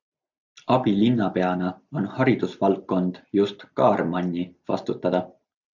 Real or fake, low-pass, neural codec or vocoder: real; 7.2 kHz; none